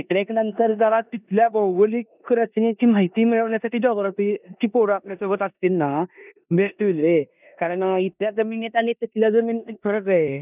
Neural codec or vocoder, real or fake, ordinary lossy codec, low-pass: codec, 16 kHz in and 24 kHz out, 0.9 kbps, LongCat-Audio-Codec, four codebook decoder; fake; none; 3.6 kHz